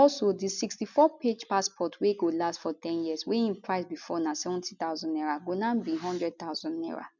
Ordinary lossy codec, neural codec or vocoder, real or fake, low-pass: none; none; real; 7.2 kHz